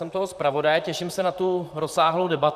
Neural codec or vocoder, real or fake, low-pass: vocoder, 44.1 kHz, 128 mel bands, Pupu-Vocoder; fake; 14.4 kHz